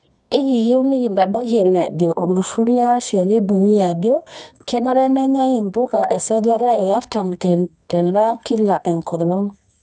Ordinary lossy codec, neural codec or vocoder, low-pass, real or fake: none; codec, 24 kHz, 0.9 kbps, WavTokenizer, medium music audio release; none; fake